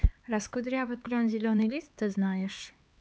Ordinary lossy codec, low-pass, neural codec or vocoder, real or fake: none; none; codec, 16 kHz, 4 kbps, X-Codec, HuBERT features, trained on LibriSpeech; fake